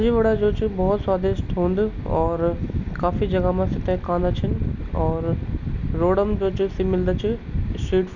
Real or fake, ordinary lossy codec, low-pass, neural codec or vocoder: real; none; 7.2 kHz; none